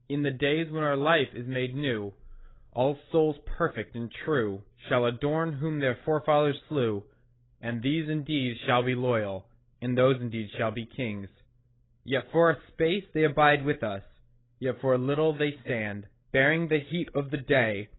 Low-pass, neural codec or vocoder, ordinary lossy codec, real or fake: 7.2 kHz; codec, 16 kHz, 16 kbps, FreqCodec, larger model; AAC, 16 kbps; fake